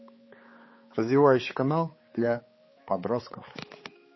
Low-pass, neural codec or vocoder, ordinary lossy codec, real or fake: 7.2 kHz; codec, 16 kHz, 4 kbps, X-Codec, HuBERT features, trained on balanced general audio; MP3, 24 kbps; fake